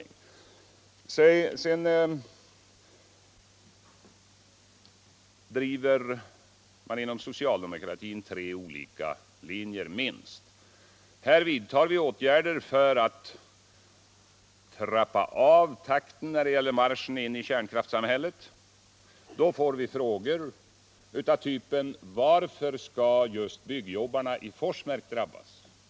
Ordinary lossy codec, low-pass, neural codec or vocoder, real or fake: none; none; none; real